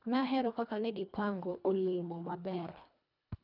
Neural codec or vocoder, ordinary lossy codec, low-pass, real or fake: codec, 24 kHz, 1.5 kbps, HILCodec; none; 5.4 kHz; fake